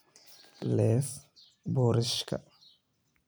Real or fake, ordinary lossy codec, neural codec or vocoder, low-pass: real; none; none; none